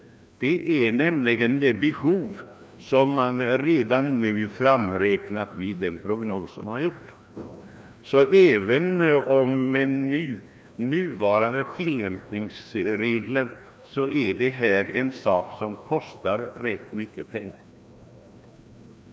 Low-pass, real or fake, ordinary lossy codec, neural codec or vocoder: none; fake; none; codec, 16 kHz, 1 kbps, FreqCodec, larger model